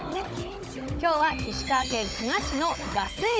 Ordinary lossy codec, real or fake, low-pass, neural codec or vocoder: none; fake; none; codec, 16 kHz, 16 kbps, FunCodec, trained on Chinese and English, 50 frames a second